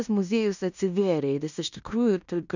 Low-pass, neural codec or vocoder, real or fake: 7.2 kHz; codec, 16 kHz in and 24 kHz out, 0.9 kbps, LongCat-Audio-Codec, fine tuned four codebook decoder; fake